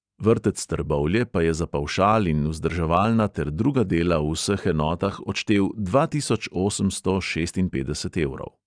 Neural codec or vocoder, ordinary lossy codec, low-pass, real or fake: none; none; 9.9 kHz; real